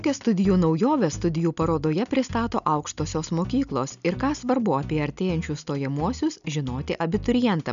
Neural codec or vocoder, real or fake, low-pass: none; real; 7.2 kHz